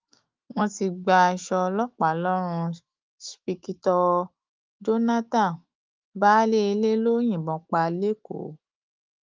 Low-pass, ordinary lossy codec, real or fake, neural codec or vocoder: 7.2 kHz; Opus, 32 kbps; fake; autoencoder, 48 kHz, 128 numbers a frame, DAC-VAE, trained on Japanese speech